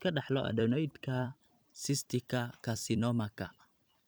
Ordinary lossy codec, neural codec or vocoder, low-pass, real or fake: none; vocoder, 44.1 kHz, 128 mel bands every 256 samples, BigVGAN v2; none; fake